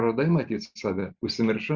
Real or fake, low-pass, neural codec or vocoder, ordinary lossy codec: real; 7.2 kHz; none; Opus, 64 kbps